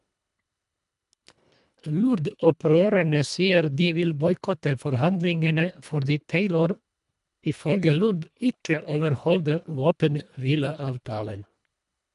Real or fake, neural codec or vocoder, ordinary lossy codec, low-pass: fake; codec, 24 kHz, 1.5 kbps, HILCodec; MP3, 96 kbps; 10.8 kHz